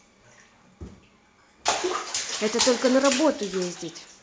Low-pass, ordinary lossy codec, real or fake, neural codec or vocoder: none; none; real; none